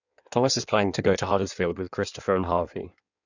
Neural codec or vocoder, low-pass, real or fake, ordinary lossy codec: codec, 16 kHz in and 24 kHz out, 1.1 kbps, FireRedTTS-2 codec; 7.2 kHz; fake; none